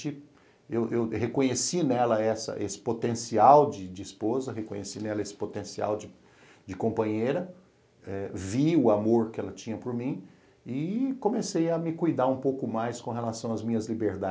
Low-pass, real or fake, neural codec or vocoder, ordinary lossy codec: none; real; none; none